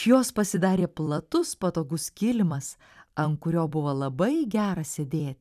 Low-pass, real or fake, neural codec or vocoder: 14.4 kHz; fake; vocoder, 44.1 kHz, 128 mel bands every 256 samples, BigVGAN v2